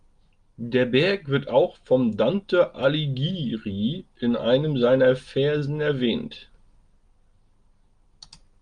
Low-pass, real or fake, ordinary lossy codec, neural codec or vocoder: 9.9 kHz; real; Opus, 32 kbps; none